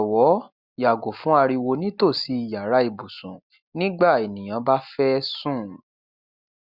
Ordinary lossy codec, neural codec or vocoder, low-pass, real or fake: Opus, 64 kbps; none; 5.4 kHz; real